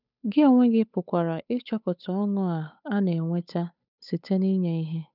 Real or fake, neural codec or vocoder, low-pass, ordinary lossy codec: fake; codec, 16 kHz, 8 kbps, FunCodec, trained on Chinese and English, 25 frames a second; 5.4 kHz; none